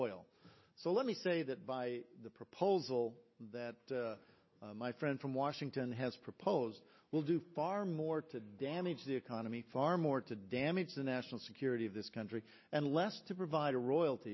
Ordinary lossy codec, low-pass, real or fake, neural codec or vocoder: MP3, 24 kbps; 7.2 kHz; real; none